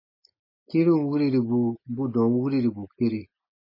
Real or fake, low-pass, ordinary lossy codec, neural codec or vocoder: real; 5.4 kHz; MP3, 24 kbps; none